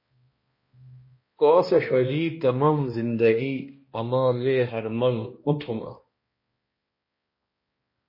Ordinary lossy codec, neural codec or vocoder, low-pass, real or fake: MP3, 24 kbps; codec, 16 kHz, 1 kbps, X-Codec, HuBERT features, trained on balanced general audio; 5.4 kHz; fake